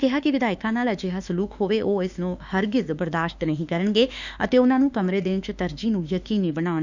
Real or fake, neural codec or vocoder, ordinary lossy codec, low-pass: fake; autoencoder, 48 kHz, 32 numbers a frame, DAC-VAE, trained on Japanese speech; none; 7.2 kHz